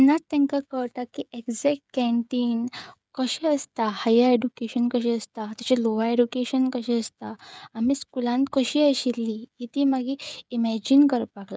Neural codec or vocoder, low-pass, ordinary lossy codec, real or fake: codec, 16 kHz, 16 kbps, FreqCodec, smaller model; none; none; fake